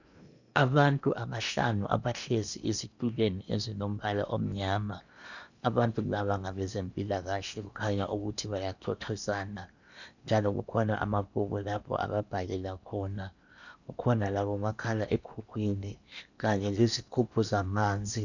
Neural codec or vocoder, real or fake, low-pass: codec, 16 kHz in and 24 kHz out, 0.8 kbps, FocalCodec, streaming, 65536 codes; fake; 7.2 kHz